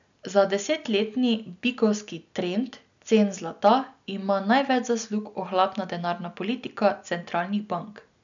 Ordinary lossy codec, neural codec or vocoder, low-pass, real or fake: none; none; 7.2 kHz; real